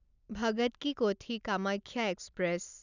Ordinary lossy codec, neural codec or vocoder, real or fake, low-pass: none; none; real; 7.2 kHz